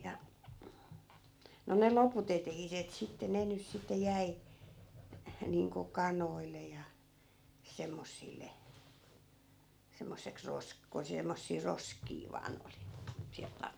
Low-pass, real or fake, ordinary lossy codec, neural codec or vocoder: none; real; none; none